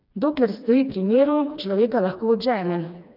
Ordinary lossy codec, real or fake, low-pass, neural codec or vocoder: none; fake; 5.4 kHz; codec, 16 kHz, 2 kbps, FreqCodec, smaller model